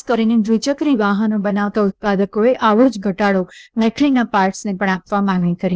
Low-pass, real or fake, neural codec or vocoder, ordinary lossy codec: none; fake; codec, 16 kHz, 0.8 kbps, ZipCodec; none